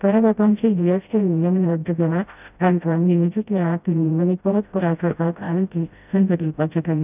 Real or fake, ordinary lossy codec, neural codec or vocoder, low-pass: fake; none; codec, 16 kHz, 0.5 kbps, FreqCodec, smaller model; 3.6 kHz